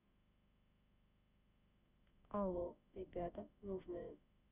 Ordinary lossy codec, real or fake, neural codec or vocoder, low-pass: none; fake; autoencoder, 48 kHz, 32 numbers a frame, DAC-VAE, trained on Japanese speech; 3.6 kHz